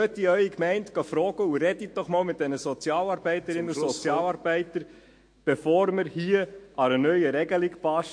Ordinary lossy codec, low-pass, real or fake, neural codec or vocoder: MP3, 48 kbps; 9.9 kHz; fake; autoencoder, 48 kHz, 128 numbers a frame, DAC-VAE, trained on Japanese speech